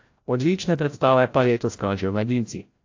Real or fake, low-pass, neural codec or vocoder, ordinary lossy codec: fake; 7.2 kHz; codec, 16 kHz, 0.5 kbps, FreqCodec, larger model; AAC, 48 kbps